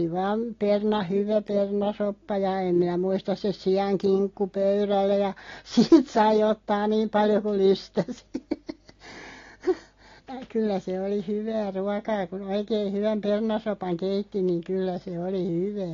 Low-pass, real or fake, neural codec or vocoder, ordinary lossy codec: 7.2 kHz; real; none; AAC, 32 kbps